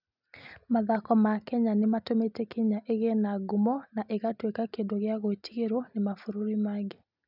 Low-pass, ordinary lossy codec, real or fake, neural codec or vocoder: 5.4 kHz; none; real; none